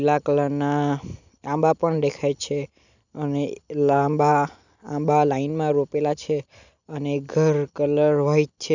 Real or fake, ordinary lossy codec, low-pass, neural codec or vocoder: real; none; 7.2 kHz; none